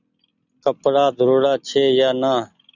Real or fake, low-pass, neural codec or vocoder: real; 7.2 kHz; none